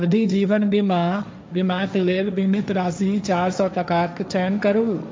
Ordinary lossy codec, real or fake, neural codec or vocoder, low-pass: none; fake; codec, 16 kHz, 1.1 kbps, Voila-Tokenizer; none